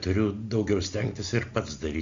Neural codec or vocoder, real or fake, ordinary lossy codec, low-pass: none; real; Opus, 64 kbps; 7.2 kHz